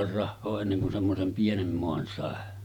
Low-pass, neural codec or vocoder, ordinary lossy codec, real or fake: 19.8 kHz; vocoder, 48 kHz, 128 mel bands, Vocos; none; fake